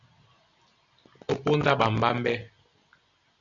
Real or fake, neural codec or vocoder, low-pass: real; none; 7.2 kHz